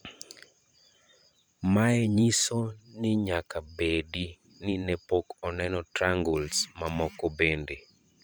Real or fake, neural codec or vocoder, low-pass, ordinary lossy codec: fake; vocoder, 44.1 kHz, 128 mel bands every 512 samples, BigVGAN v2; none; none